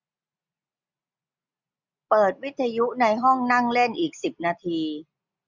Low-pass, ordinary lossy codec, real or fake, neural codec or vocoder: 7.2 kHz; none; real; none